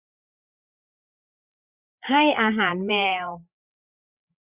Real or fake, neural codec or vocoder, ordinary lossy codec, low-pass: fake; codec, 16 kHz, 8 kbps, FreqCodec, larger model; Opus, 64 kbps; 3.6 kHz